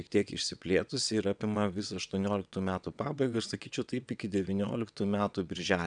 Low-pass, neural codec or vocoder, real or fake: 9.9 kHz; vocoder, 22.05 kHz, 80 mel bands, WaveNeXt; fake